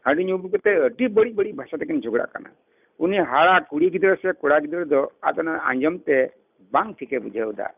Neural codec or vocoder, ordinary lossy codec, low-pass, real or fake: none; none; 3.6 kHz; real